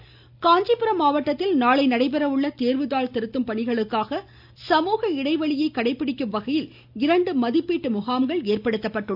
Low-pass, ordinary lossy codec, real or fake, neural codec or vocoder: 5.4 kHz; none; real; none